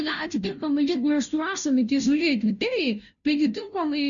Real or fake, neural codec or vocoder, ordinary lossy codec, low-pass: fake; codec, 16 kHz, 0.5 kbps, FunCodec, trained on Chinese and English, 25 frames a second; MP3, 96 kbps; 7.2 kHz